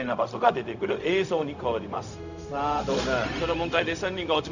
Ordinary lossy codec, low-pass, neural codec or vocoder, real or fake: none; 7.2 kHz; codec, 16 kHz, 0.4 kbps, LongCat-Audio-Codec; fake